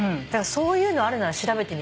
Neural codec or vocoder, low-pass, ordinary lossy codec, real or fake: none; none; none; real